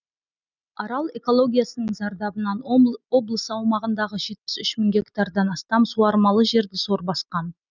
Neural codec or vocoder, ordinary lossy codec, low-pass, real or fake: none; none; 7.2 kHz; real